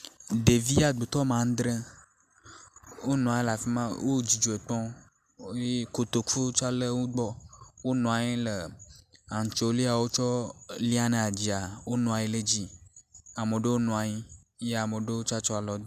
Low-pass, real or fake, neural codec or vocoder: 14.4 kHz; real; none